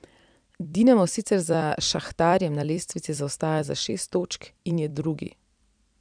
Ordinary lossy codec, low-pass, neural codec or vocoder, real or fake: none; 9.9 kHz; vocoder, 44.1 kHz, 128 mel bands every 256 samples, BigVGAN v2; fake